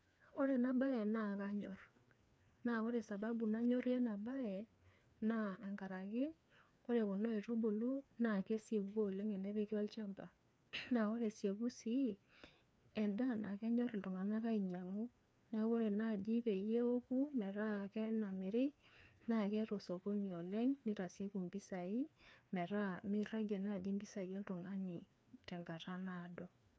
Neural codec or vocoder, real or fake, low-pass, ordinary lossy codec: codec, 16 kHz, 2 kbps, FreqCodec, larger model; fake; none; none